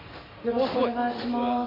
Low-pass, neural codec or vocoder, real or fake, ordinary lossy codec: 5.4 kHz; none; real; AAC, 24 kbps